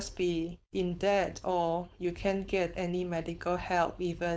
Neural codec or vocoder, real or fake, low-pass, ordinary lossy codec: codec, 16 kHz, 4.8 kbps, FACodec; fake; none; none